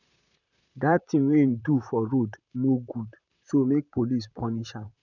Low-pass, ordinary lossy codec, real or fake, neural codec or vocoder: 7.2 kHz; none; fake; vocoder, 44.1 kHz, 128 mel bands, Pupu-Vocoder